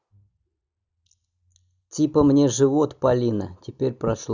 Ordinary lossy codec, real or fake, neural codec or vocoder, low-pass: none; real; none; 7.2 kHz